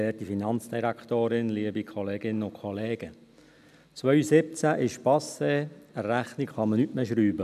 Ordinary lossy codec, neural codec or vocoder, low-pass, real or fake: none; none; 14.4 kHz; real